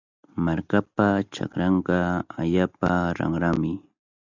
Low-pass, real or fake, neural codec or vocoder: 7.2 kHz; real; none